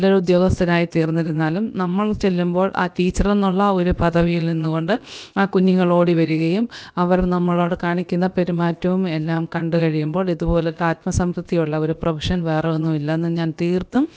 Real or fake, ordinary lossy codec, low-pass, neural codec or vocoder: fake; none; none; codec, 16 kHz, about 1 kbps, DyCAST, with the encoder's durations